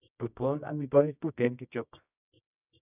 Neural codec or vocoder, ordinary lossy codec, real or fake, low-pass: codec, 24 kHz, 0.9 kbps, WavTokenizer, medium music audio release; none; fake; 3.6 kHz